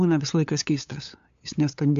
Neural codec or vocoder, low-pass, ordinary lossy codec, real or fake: codec, 16 kHz, 8 kbps, FunCodec, trained on LibriTTS, 25 frames a second; 7.2 kHz; AAC, 64 kbps; fake